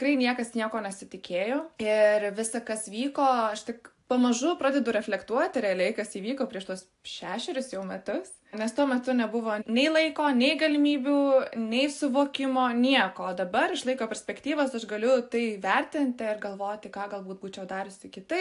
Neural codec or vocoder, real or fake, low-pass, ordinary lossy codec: none; real; 10.8 kHz; AAC, 64 kbps